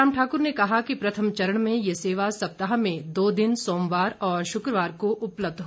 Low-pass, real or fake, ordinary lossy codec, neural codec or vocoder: none; real; none; none